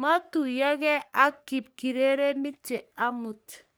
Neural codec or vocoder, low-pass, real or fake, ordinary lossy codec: codec, 44.1 kHz, 3.4 kbps, Pupu-Codec; none; fake; none